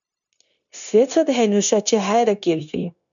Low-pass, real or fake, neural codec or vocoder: 7.2 kHz; fake; codec, 16 kHz, 0.9 kbps, LongCat-Audio-Codec